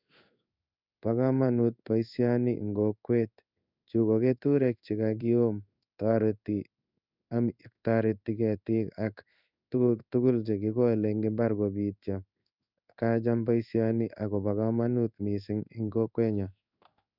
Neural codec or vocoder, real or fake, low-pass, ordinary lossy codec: codec, 16 kHz in and 24 kHz out, 1 kbps, XY-Tokenizer; fake; 5.4 kHz; none